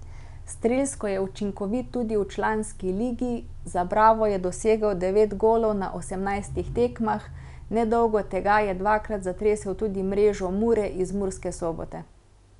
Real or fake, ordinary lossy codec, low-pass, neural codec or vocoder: real; none; 10.8 kHz; none